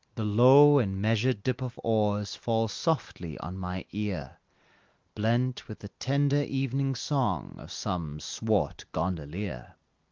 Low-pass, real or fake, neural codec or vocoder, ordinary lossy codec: 7.2 kHz; real; none; Opus, 24 kbps